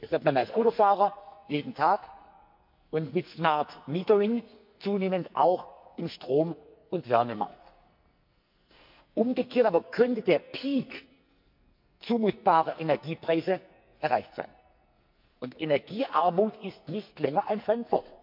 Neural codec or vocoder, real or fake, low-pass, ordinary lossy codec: codec, 44.1 kHz, 2.6 kbps, SNAC; fake; 5.4 kHz; MP3, 48 kbps